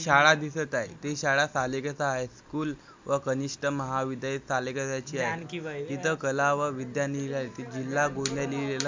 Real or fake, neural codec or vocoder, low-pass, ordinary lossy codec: real; none; 7.2 kHz; MP3, 64 kbps